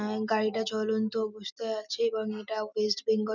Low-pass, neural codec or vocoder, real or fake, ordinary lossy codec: 7.2 kHz; none; real; none